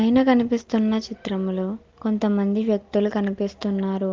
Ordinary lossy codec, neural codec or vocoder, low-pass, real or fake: Opus, 16 kbps; none; 7.2 kHz; real